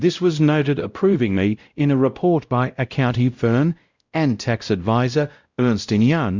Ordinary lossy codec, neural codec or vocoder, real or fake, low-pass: Opus, 64 kbps; codec, 16 kHz, 0.5 kbps, X-Codec, WavLM features, trained on Multilingual LibriSpeech; fake; 7.2 kHz